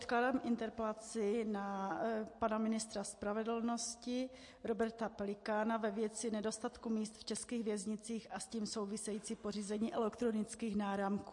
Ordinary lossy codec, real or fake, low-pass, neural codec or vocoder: MP3, 48 kbps; real; 10.8 kHz; none